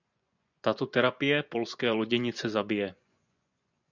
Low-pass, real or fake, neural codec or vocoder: 7.2 kHz; fake; vocoder, 24 kHz, 100 mel bands, Vocos